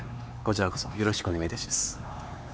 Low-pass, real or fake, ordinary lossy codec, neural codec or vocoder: none; fake; none; codec, 16 kHz, 4 kbps, X-Codec, HuBERT features, trained on LibriSpeech